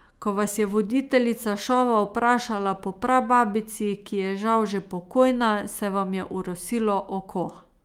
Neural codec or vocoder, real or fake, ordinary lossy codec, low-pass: autoencoder, 48 kHz, 128 numbers a frame, DAC-VAE, trained on Japanese speech; fake; Opus, 32 kbps; 19.8 kHz